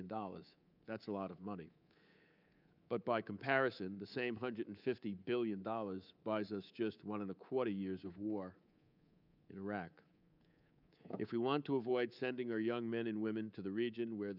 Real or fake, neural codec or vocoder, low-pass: fake; codec, 24 kHz, 3.1 kbps, DualCodec; 5.4 kHz